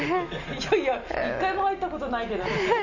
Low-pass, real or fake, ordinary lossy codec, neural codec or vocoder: 7.2 kHz; real; none; none